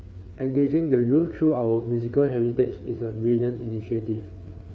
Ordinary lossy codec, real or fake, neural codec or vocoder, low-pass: none; fake; codec, 16 kHz, 4 kbps, FreqCodec, larger model; none